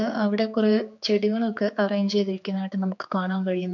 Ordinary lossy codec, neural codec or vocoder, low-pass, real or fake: none; codec, 16 kHz, 4 kbps, X-Codec, HuBERT features, trained on general audio; 7.2 kHz; fake